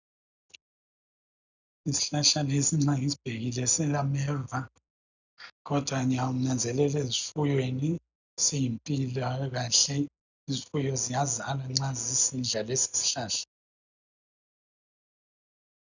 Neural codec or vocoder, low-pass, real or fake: none; 7.2 kHz; real